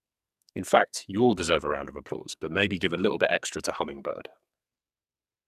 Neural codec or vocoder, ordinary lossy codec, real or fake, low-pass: codec, 44.1 kHz, 2.6 kbps, SNAC; none; fake; 14.4 kHz